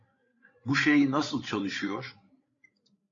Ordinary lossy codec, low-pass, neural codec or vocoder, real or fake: AAC, 32 kbps; 7.2 kHz; codec, 16 kHz, 16 kbps, FreqCodec, larger model; fake